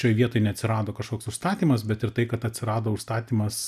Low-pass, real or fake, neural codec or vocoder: 14.4 kHz; real; none